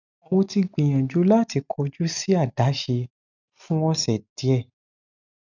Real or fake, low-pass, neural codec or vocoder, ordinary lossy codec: real; 7.2 kHz; none; none